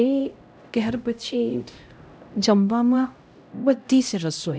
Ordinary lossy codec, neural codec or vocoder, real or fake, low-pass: none; codec, 16 kHz, 0.5 kbps, X-Codec, HuBERT features, trained on LibriSpeech; fake; none